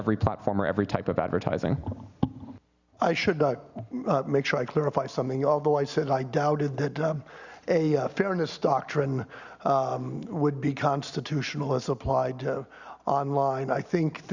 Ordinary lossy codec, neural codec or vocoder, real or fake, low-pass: Opus, 64 kbps; none; real; 7.2 kHz